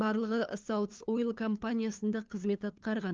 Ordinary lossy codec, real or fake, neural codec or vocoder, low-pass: Opus, 24 kbps; fake; codec, 16 kHz, 2 kbps, FunCodec, trained on LibriTTS, 25 frames a second; 7.2 kHz